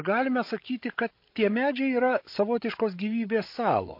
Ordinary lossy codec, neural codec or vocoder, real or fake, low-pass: MP3, 32 kbps; codec, 16 kHz, 16 kbps, FreqCodec, larger model; fake; 5.4 kHz